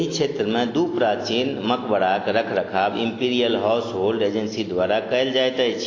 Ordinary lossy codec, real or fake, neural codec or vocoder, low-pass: AAC, 32 kbps; real; none; 7.2 kHz